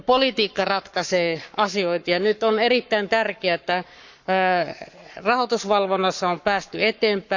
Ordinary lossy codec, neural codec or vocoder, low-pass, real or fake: none; codec, 44.1 kHz, 7.8 kbps, Pupu-Codec; 7.2 kHz; fake